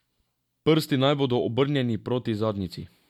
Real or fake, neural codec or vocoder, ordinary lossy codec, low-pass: real; none; MP3, 96 kbps; 19.8 kHz